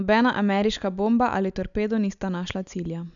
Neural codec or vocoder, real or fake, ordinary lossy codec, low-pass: none; real; none; 7.2 kHz